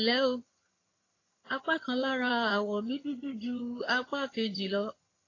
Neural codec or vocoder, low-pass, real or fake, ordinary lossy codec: vocoder, 22.05 kHz, 80 mel bands, HiFi-GAN; 7.2 kHz; fake; AAC, 32 kbps